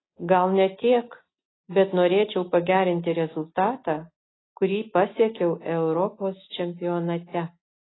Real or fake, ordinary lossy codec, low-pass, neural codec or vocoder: real; AAC, 16 kbps; 7.2 kHz; none